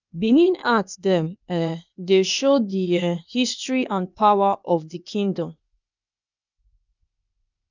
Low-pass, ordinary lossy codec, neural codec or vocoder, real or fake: 7.2 kHz; none; codec, 16 kHz, 0.8 kbps, ZipCodec; fake